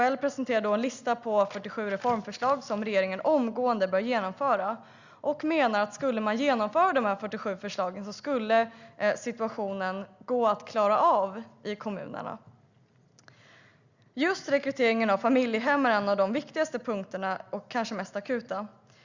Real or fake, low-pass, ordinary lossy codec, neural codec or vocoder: real; 7.2 kHz; Opus, 64 kbps; none